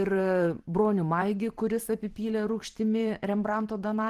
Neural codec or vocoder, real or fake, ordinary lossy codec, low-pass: vocoder, 44.1 kHz, 128 mel bands every 512 samples, BigVGAN v2; fake; Opus, 16 kbps; 14.4 kHz